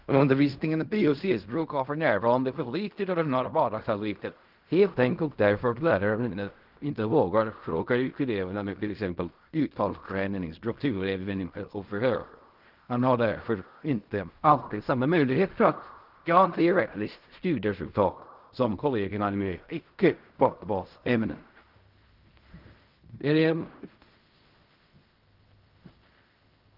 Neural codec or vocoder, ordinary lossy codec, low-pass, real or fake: codec, 16 kHz in and 24 kHz out, 0.4 kbps, LongCat-Audio-Codec, fine tuned four codebook decoder; Opus, 32 kbps; 5.4 kHz; fake